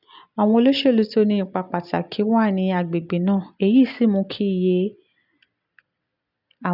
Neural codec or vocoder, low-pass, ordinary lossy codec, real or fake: none; 5.4 kHz; none; real